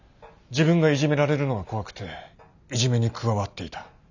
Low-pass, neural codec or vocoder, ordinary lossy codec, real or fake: 7.2 kHz; none; none; real